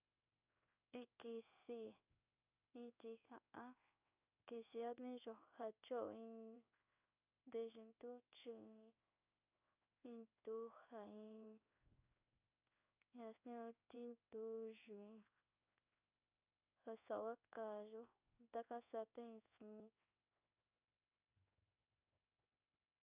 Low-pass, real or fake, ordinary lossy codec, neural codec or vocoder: 3.6 kHz; fake; none; codec, 16 kHz in and 24 kHz out, 1 kbps, XY-Tokenizer